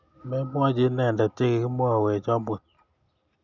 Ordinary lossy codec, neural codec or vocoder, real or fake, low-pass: none; none; real; 7.2 kHz